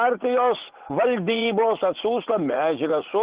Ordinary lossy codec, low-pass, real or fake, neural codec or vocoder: Opus, 16 kbps; 3.6 kHz; fake; vocoder, 44.1 kHz, 80 mel bands, Vocos